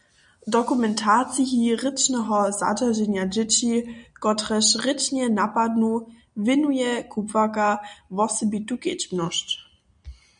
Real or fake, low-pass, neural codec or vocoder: real; 9.9 kHz; none